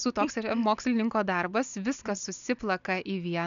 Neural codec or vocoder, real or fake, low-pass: none; real; 7.2 kHz